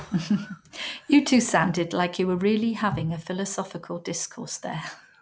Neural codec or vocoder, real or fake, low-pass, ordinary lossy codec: none; real; none; none